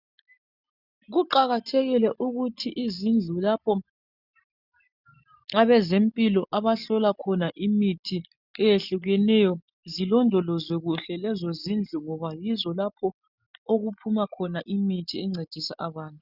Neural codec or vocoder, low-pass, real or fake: none; 5.4 kHz; real